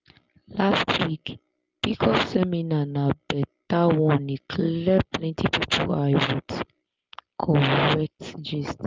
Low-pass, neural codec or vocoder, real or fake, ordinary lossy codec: 7.2 kHz; none; real; Opus, 32 kbps